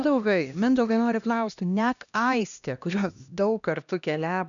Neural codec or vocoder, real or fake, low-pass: codec, 16 kHz, 1 kbps, X-Codec, HuBERT features, trained on LibriSpeech; fake; 7.2 kHz